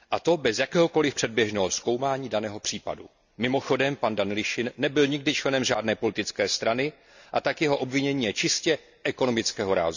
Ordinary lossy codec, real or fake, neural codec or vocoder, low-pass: none; real; none; 7.2 kHz